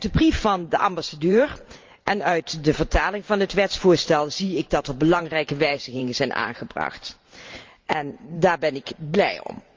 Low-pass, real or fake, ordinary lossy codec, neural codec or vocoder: 7.2 kHz; fake; Opus, 32 kbps; vocoder, 44.1 kHz, 128 mel bands every 512 samples, BigVGAN v2